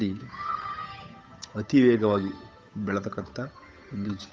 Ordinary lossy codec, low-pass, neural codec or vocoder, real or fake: none; none; codec, 16 kHz, 8 kbps, FunCodec, trained on Chinese and English, 25 frames a second; fake